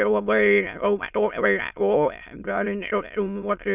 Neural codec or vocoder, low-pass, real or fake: autoencoder, 22.05 kHz, a latent of 192 numbers a frame, VITS, trained on many speakers; 3.6 kHz; fake